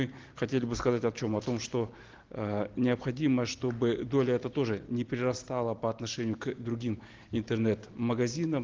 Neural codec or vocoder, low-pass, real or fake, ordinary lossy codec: none; 7.2 kHz; real; Opus, 16 kbps